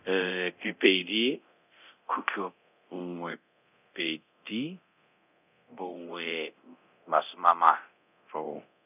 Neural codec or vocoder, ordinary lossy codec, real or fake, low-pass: codec, 24 kHz, 0.9 kbps, DualCodec; none; fake; 3.6 kHz